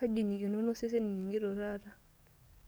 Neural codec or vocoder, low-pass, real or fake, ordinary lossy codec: codec, 44.1 kHz, 7.8 kbps, DAC; none; fake; none